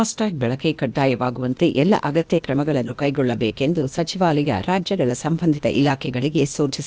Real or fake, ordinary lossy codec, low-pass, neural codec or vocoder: fake; none; none; codec, 16 kHz, 0.8 kbps, ZipCodec